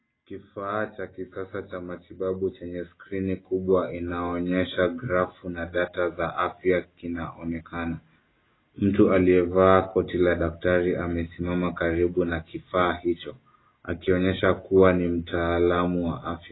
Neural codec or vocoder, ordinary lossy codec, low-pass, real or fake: none; AAC, 16 kbps; 7.2 kHz; real